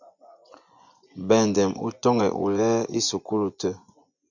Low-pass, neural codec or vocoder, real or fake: 7.2 kHz; vocoder, 24 kHz, 100 mel bands, Vocos; fake